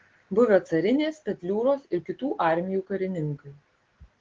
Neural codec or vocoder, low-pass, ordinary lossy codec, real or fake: none; 7.2 kHz; Opus, 16 kbps; real